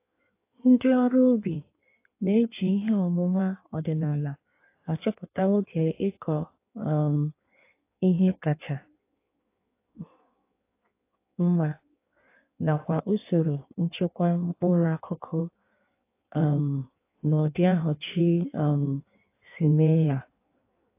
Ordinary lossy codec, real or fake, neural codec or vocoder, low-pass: AAC, 24 kbps; fake; codec, 16 kHz in and 24 kHz out, 1.1 kbps, FireRedTTS-2 codec; 3.6 kHz